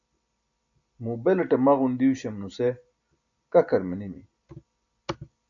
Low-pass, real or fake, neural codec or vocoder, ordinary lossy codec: 7.2 kHz; real; none; AAC, 64 kbps